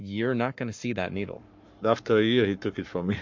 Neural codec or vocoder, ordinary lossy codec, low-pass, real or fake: codec, 44.1 kHz, 7.8 kbps, Pupu-Codec; MP3, 48 kbps; 7.2 kHz; fake